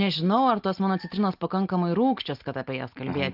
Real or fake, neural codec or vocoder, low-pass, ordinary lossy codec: real; none; 5.4 kHz; Opus, 32 kbps